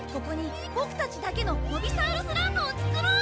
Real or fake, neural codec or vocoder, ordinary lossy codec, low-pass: real; none; none; none